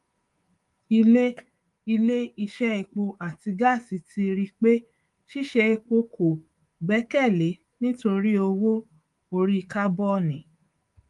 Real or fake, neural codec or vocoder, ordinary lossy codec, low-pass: fake; codec, 24 kHz, 3.1 kbps, DualCodec; Opus, 24 kbps; 10.8 kHz